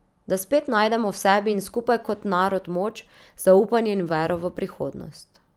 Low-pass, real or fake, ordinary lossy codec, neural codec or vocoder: 19.8 kHz; fake; Opus, 32 kbps; vocoder, 44.1 kHz, 128 mel bands every 512 samples, BigVGAN v2